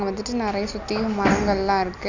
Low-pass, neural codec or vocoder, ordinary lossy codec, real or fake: 7.2 kHz; none; none; real